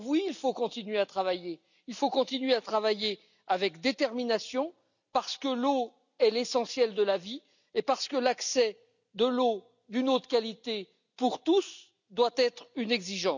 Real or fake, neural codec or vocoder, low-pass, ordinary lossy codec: real; none; 7.2 kHz; none